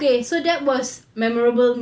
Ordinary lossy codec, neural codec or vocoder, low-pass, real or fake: none; none; none; real